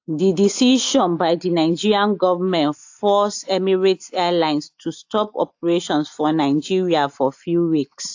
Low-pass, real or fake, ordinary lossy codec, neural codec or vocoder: 7.2 kHz; real; AAC, 48 kbps; none